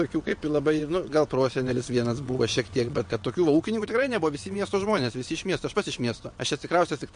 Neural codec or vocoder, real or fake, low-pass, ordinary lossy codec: vocoder, 22.05 kHz, 80 mel bands, WaveNeXt; fake; 9.9 kHz; MP3, 48 kbps